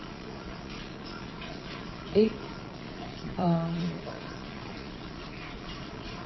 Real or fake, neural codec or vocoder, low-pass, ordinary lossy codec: fake; vocoder, 22.05 kHz, 80 mel bands, Vocos; 7.2 kHz; MP3, 24 kbps